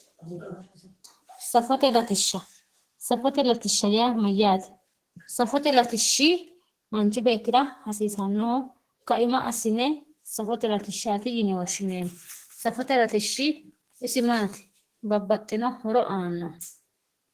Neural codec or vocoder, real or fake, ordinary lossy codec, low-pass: codec, 32 kHz, 1.9 kbps, SNAC; fake; Opus, 16 kbps; 14.4 kHz